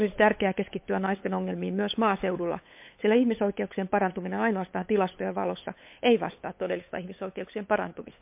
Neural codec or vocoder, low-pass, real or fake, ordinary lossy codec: codec, 16 kHz, 8 kbps, FunCodec, trained on LibriTTS, 25 frames a second; 3.6 kHz; fake; MP3, 32 kbps